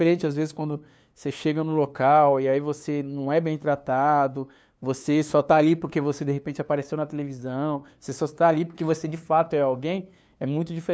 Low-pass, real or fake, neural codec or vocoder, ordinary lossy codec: none; fake; codec, 16 kHz, 2 kbps, FunCodec, trained on LibriTTS, 25 frames a second; none